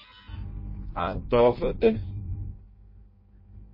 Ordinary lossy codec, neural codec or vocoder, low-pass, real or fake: MP3, 24 kbps; codec, 16 kHz in and 24 kHz out, 0.6 kbps, FireRedTTS-2 codec; 5.4 kHz; fake